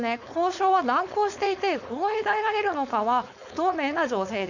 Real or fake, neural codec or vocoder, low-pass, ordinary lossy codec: fake; codec, 16 kHz, 4.8 kbps, FACodec; 7.2 kHz; none